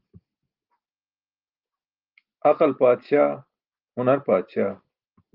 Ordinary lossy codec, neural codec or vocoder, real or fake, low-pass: Opus, 24 kbps; none; real; 5.4 kHz